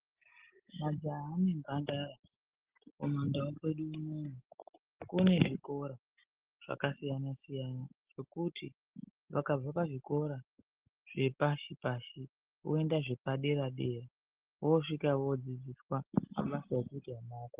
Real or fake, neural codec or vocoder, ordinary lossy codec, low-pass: real; none; Opus, 16 kbps; 3.6 kHz